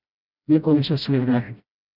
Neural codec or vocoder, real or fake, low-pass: codec, 16 kHz, 0.5 kbps, FreqCodec, smaller model; fake; 5.4 kHz